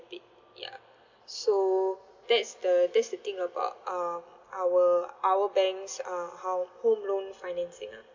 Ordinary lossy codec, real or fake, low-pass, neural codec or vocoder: MP3, 64 kbps; real; 7.2 kHz; none